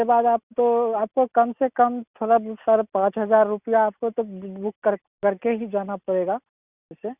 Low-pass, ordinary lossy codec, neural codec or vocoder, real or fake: 3.6 kHz; Opus, 64 kbps; none; real